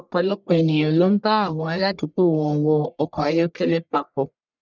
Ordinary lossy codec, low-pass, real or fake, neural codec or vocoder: none; 7.2 kHz; fake; codec, 44.1 kHz, 1.7 kbps, Pupu-Codec